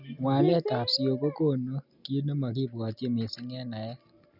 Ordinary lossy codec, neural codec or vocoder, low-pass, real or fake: none; none; 5.4 kHz; real